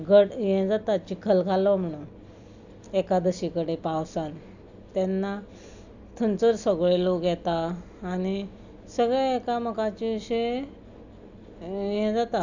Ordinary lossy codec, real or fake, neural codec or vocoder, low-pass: none; real; none; 7.2 kHz